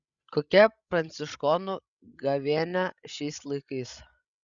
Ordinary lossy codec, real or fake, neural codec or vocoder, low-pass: Opus, 64 kbps; fake; codec, 16 kHz, 16 kbps, FreqCodec, larger model; 7.2 kHz